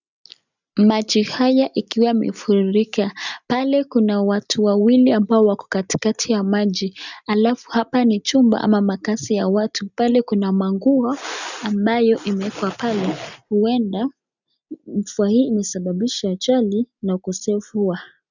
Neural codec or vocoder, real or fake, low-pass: none; real; 7.2 kHz